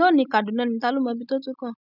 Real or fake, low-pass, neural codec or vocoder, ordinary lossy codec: real; 5.4 kHz; none; none